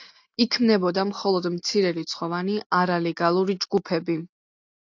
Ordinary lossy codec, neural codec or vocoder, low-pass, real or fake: AAC, 48 kbps; none; 7.2 kHz; real